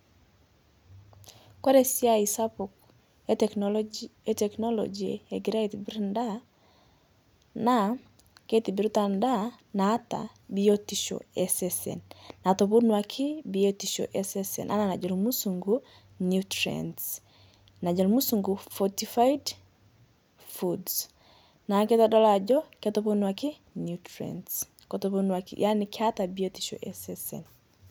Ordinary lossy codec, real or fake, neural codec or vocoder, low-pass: none; real; none; none